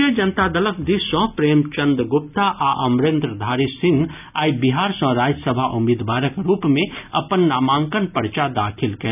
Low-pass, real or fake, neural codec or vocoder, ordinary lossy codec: 3.6 kHz; real; none; none